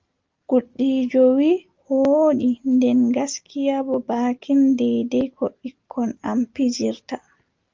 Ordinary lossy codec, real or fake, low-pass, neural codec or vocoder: Opus, 32 kbps; real; 7.2 kHz; none